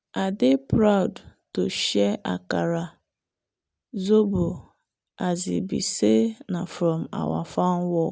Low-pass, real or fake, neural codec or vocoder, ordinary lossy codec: none; real; none; none